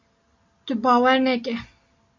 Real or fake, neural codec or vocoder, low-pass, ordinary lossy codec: real; none; 7.2 kHz; MP3, 48 kbps